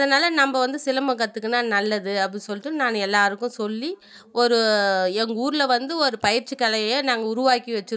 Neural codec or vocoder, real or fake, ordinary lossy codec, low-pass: none; real; none; none